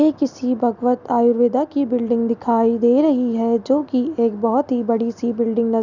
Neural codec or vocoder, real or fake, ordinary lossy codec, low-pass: none; real; none; 7.2 kHz